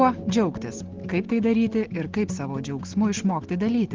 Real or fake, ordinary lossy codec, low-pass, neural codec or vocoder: real; Opus, 16 kbps; 7.2 kHz; none